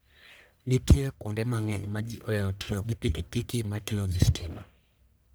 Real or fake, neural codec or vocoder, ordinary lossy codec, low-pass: fake; codec, 44.1 kHz, 1.7 kbps, Pupu-Codec; none; none